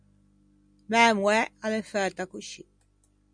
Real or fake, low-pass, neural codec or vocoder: real; 9.9 kHz; none